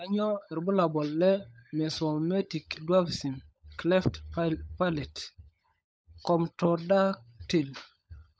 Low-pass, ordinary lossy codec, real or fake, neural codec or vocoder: none; none; fake; codec, 16 kHz, 16 kbps, FunCodec, trained on LibriTTS, 50 frames a second